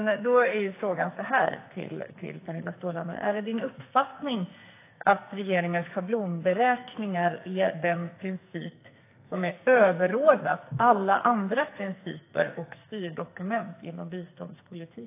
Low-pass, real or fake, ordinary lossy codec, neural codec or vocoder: 3.6 kHz; fake; AAC, 24 kbps; codec, 44.1 kHz, 2.6 kbps, SNAC